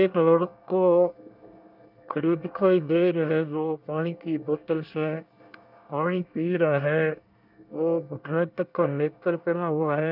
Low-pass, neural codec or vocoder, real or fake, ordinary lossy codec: 5.4 kHz; codec, 24 kHz, 1 kbps, SNAC; fake; none